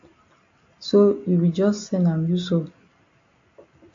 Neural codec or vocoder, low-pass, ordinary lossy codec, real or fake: none; 7.2 kHz; AAC, 48 kbps; real